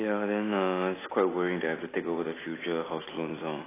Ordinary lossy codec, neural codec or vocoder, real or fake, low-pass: AAC, 16 kbps; none; real; 3.6 kHz